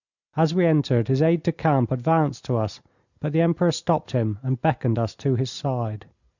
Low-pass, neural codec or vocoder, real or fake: 7.2 kHz; none; real